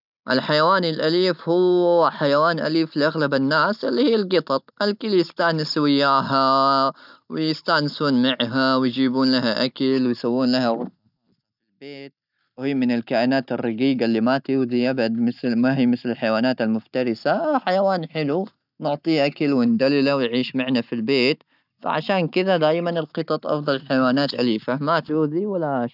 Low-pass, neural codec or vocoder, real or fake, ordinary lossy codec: 5.4 kHz; none; real; none